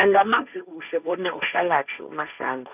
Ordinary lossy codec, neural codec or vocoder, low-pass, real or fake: none; codec, 16 kHz, 1.1 kbps, Voila-Tokenizer; 3.6 kHz; fake